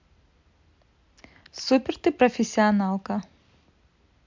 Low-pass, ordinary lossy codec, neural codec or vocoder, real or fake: 7.2 kHz; MP3, 64 kbps; none; real